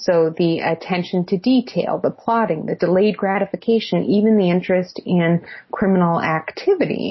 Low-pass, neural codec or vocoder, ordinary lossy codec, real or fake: 7.2 kHz; none; MP3, 24 kbps; real